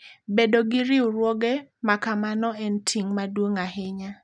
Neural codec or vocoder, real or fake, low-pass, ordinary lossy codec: none; real; 9.9 kHz; none